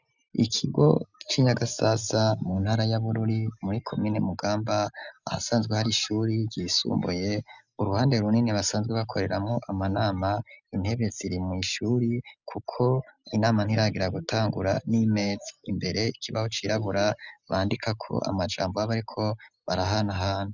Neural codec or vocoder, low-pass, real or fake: none; 7.2 kHz; real